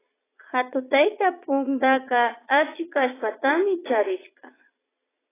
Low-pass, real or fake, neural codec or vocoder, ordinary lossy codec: 3.6 kHz; fake; vocoder, 22.05 kHz, 80 mel bands, Vocos; AAC, 16 kbps